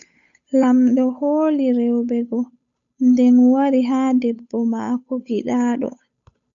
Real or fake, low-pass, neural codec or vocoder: fake; 7.2 kHz; codec, 16 kHz, 8 kbps, FunCodec, trained on Chinese and English, 25 frames a second